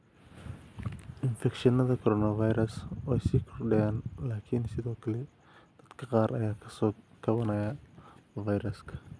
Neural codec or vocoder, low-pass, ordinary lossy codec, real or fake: none; none; none; real